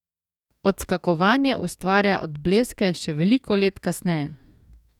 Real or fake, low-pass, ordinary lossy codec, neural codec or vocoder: fake; 19.8 kHz; none; codec, 44.1 kHz, 2.6 kbps, DAC